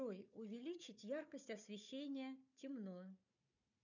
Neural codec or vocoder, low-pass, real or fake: codec, 16 kHz, 4 kbps, FunCodec, trained on Chinese and English, 50 frames a second; 7.2 kHz; fake